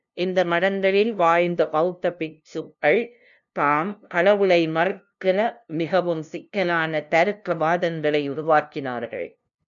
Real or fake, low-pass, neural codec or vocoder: fake; 7.2 kHz; codec, 16 kHz, 0.5 kbps, FunCodec, trained on LibriTTS, 25 frames a second